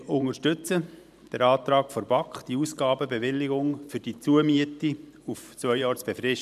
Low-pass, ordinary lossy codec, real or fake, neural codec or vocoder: 14.4 kHz; none; fake; vocoder, 44.1 kHz, 128 mel bands every 512 samples, BigVGAN v2